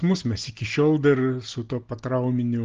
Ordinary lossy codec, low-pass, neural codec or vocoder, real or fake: Opus, 16 kbps; 7.2 kHz; none; real